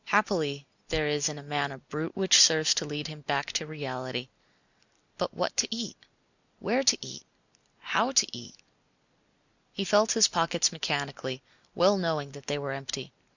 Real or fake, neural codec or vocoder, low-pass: real; none; 7.2 kHz